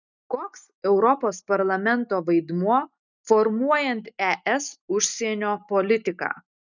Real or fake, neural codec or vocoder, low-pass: real; none; 7.2 kHz